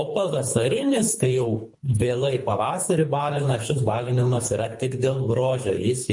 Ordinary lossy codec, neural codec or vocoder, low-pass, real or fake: MP3, 48 kbps; codec, 24 kHz, 3 kbps, HILCodec; 10.8 kHz; fake